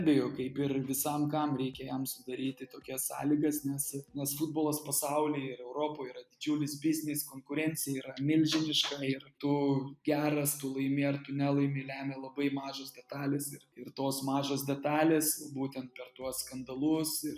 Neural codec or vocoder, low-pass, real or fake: none; 14.4 kHz; real